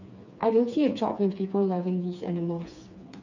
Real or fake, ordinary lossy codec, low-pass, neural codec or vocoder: fake; none; 7.2 kHz; codec, 16 kHz, 4 kbps, FreqCodec, smaller model